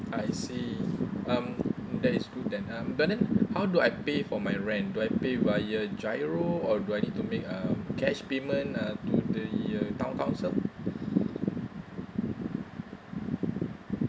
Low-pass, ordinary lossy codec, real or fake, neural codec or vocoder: none; none; real; none